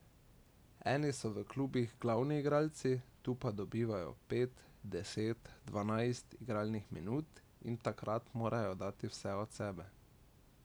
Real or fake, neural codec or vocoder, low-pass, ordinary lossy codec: real; none; none; none